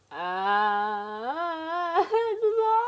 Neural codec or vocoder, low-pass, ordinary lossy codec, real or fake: none; none; none; real